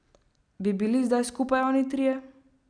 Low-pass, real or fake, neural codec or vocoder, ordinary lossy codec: 9.9 kHz; real; none; none